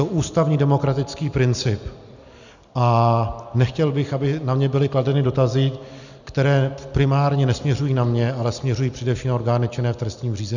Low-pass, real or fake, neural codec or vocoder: 7.2 kHz; real; none